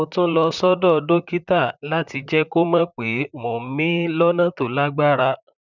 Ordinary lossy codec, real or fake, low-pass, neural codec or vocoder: none; fake; 7.2 kHz; vocoder, 44.1 kHz, 128 mel bands, Pupu-Vocoder